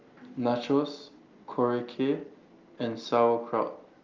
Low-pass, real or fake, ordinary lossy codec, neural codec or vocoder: 7.2 kHz; real; Opus, 32 kbps; none